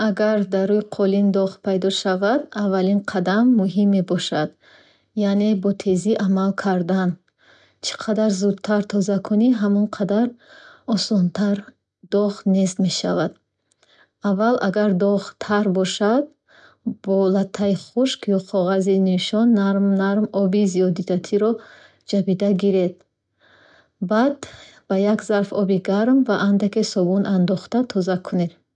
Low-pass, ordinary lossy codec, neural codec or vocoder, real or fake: 9.9 kHz; none; none; real